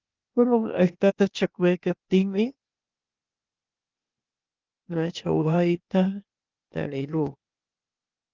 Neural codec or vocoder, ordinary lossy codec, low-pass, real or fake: codec, 16 kHz, 0.8 kbps, ZipCodec; Opus, 24 kbps; 7.2 kHz; fake